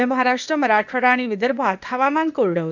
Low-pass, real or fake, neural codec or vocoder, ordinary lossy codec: 7.2 kHz; fake; codec, 16 kHz, 0.8 kbps, ZipCodec; none